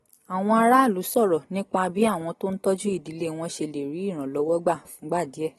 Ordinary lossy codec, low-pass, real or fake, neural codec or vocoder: AAC, 32 kbps; 14.4 kHz; real; none